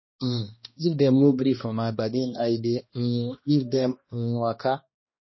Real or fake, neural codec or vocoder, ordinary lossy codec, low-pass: fake; codec, 16 kHz, 1 kbps, X-Codec, HuBERT features, trained on balanced general audio; MP3, 24 kbps; 7.2 kHz